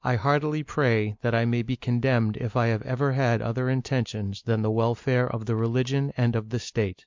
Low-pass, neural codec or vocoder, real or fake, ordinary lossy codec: 7.2 kHz; none; real; MP3, 64 kbps